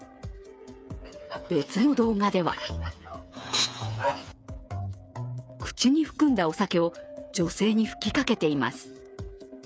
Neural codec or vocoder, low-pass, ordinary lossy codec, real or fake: codec, 16 kHz, 8 kbps, FreqCodec, smaller model; none; none; fake